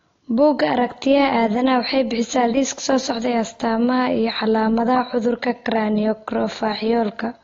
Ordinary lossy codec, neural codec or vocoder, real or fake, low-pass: AAC, 32 kbps; none; real; 7.2 kHz